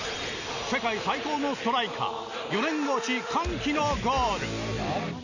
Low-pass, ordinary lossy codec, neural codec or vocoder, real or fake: 7.2 kHz; none; none; real